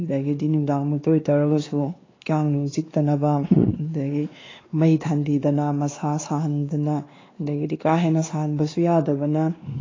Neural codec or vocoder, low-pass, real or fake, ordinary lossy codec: codec, 16 kHz, 2 kbps, X-Codec, WavLM features, trained on Multilingual LibriSpeech; 7.2 kHz; fake; AAC, 32 kbps